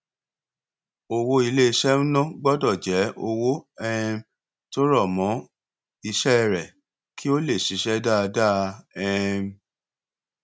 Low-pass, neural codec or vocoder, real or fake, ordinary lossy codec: none; none; real; none